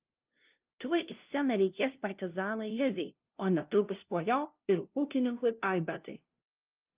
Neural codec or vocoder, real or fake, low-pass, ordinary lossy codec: codec, 16 kHz, 0.5 kbps, FunCodec, trained on LibriTTS, 25 frames a second; fake; 3.6 kHz; Opus, 24 kbps